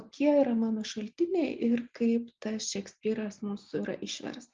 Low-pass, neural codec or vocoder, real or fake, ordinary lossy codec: 7.2 kHz; none; real; Opus, 24 kbps